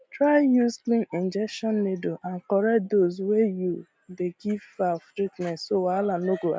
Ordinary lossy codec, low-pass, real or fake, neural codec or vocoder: none; none; real; none